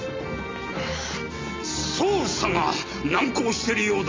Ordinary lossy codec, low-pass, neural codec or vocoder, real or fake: MP3, 48 kbps; 7.2 kHz; none; real